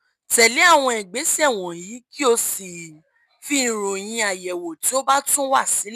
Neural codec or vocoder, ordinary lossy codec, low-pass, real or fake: none; none; 14.4 kHz; real